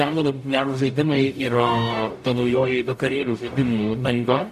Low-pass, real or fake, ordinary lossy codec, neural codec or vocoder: 14.4 kHz; fake; MP3, 64 kbps; codec, 44.1 kHz, 0.9 kbps, DAC